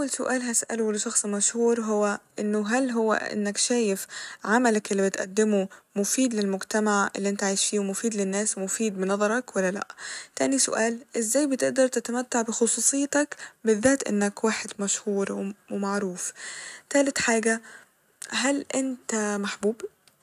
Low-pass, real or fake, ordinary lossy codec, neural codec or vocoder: 14.4 kHz; real; none; none